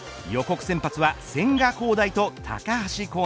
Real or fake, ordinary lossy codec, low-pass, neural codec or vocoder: real; none; none; none